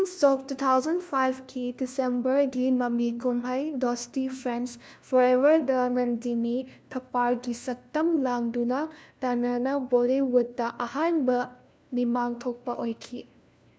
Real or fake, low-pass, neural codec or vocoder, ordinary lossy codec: fake; none; codec, 16 kHz, 1 kbps, FunCodec, trained on LibriTTS, 50 frames a second; none